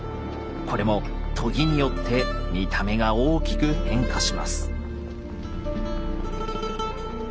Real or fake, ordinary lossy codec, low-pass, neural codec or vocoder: real; none; none; none